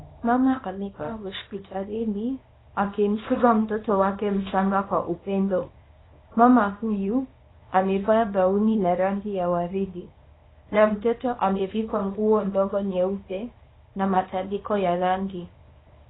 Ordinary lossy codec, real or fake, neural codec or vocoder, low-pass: AAC, 16 kbps; fake; codec, 24 kHz, 0.9 kbps, WavTokenizer, small release; 7.2 kHz